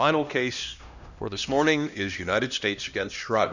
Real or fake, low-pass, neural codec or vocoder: fake; 7.2 kHz; codec, 16 kHz, 1 kbps, X-Codec, HuBERT features, trained on LibriSpeech